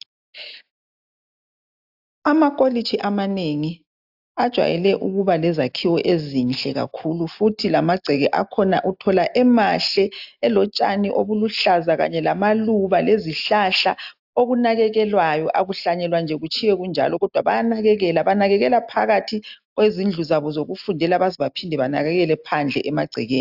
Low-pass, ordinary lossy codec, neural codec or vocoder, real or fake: 5.4 kHz; AAC, 48 kbps; none; real